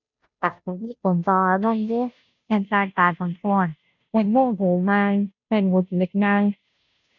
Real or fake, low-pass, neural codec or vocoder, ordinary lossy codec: fake; 7.2 kHz; codec, 16 kHz, 0.5 kbps, FunCodec, trained on Chinese and English, 25 frames a second; none